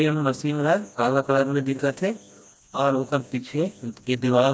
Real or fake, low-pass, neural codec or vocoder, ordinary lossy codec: fake; none; codec, 16 kHz, 1 kbps, FreqCodec, smaller model; none